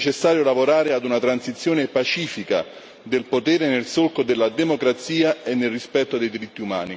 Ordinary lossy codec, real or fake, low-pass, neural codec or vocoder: none; real; none; none